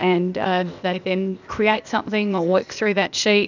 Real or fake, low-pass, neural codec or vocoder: fake; 7.2 kHz; codec, 16 kHz, 0.8 kbps, ZipCodec